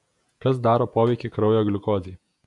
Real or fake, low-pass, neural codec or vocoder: fake; 10.8 kHz; vocoder, 48 kHz, 128 mel bands, Vocos